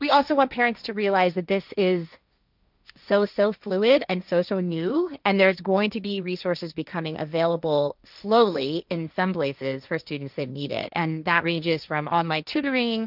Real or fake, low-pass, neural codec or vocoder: fake; 5.4 kHz; codec, 16 kHz, 1.1 kbps, Voila-Tokenizer